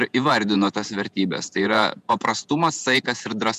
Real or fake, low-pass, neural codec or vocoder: fake; 14.4 kHz; vocoder, 48 kHz, 128 mel bands, Vocos